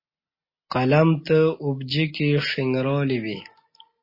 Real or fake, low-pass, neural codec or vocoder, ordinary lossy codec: real; 5.4 kHz; none; MP3, 24 kbps